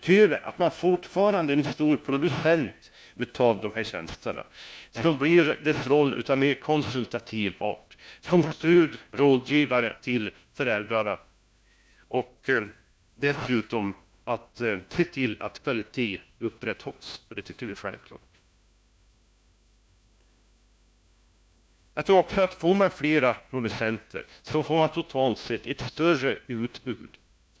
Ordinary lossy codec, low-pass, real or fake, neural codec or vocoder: none; none; fake; codec, 16 kHz, 1 kbps, FunCodec, trained on LibriTTS, 50 frames a second